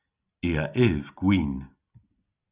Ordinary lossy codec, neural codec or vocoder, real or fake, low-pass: Opus, 64 kbps; none; real; 3.6 kHz